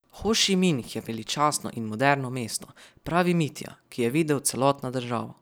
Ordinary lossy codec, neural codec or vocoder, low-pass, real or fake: none; none; none; real